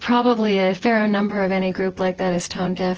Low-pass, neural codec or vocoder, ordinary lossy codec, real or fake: 7.2 kHz; vocoder, 24 kHz, 100 mel bands, Vocos; Opus, 16 kbps; fake